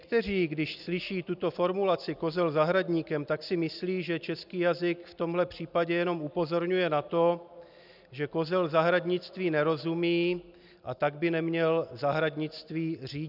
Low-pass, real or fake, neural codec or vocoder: 5.4 kHz; real; none